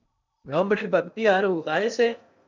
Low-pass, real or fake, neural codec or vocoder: 7.2 kHz; fake; codec, 16 kHz in and 24 kHz out, 0.6 kbps, FocalCodec, streaming, 2048 codes